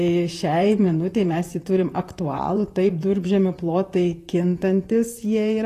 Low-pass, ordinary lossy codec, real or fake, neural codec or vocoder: 14.4 kHz; AAC, 48 kbps; fake; vocoder, 44.1 kHz, 128 mel bands, Pupu-Vocoder